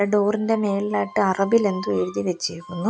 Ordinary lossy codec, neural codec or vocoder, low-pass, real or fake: none; none; none; real